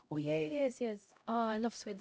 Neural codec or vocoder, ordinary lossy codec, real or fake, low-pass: codec, 16 kHz, 0.5 kbps, X-Codec, HuBERT features, trained on LibriSpeech; none; fake; none